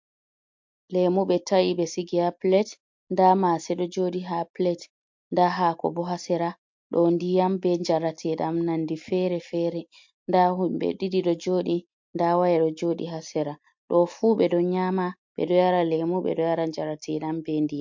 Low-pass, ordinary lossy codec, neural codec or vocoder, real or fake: 7.2 kHz; MP3, 48 kbps; none; real